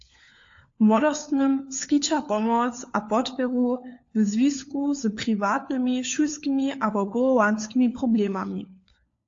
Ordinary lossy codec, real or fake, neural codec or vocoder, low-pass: AAC, 48 kbps; fake; codec, 16 kHz, 4 kbps, FunCodec, trained on LibriTTS, 50 frames a second; 7.2 kHz